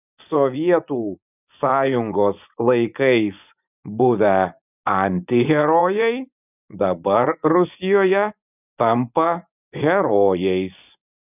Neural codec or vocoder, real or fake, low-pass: vocoder, 24 kHz, 100 mel bands, Vocos; fake; 3.6 kHz